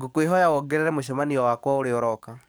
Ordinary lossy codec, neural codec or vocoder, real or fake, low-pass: none; codec, 44.1 kHz, 7.8 kbps, DAC; fake; none